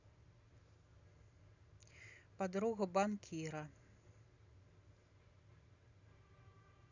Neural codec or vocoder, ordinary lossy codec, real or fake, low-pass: none; none; real; 7.2 kHz